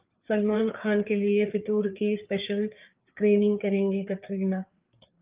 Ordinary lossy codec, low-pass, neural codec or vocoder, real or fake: Opus, 24 kbps; 3.6 kHz; codec, 16 kHz, 4 kbps, FreqCodec, larger model; fake